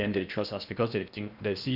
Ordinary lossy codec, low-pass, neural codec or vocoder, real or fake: none; 5.4 kHz; codec, 16 kHz in and 24 kHz out, 0.8 kbps, FocalCodec, streaming, 65536 codes; fake